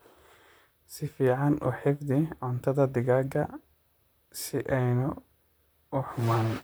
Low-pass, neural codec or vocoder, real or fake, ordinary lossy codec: none; vocoder, 44.1 kHz, 128 mel bands, Pupu-Vocoder; fake; none